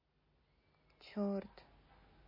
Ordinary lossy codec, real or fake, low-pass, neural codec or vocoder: MP3, 24 kbps; real; 5.4 kHz; none